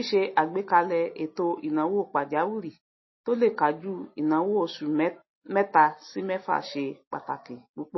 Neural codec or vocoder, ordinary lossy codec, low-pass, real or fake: none; MP3, 24 kbps; 7.2 kHz; real